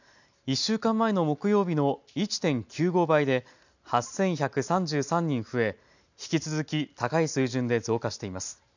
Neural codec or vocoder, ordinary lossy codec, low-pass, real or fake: none; none; 7.2 kHz; real